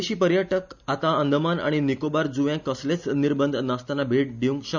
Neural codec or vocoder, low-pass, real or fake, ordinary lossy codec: none; 7.2 kHz; real; none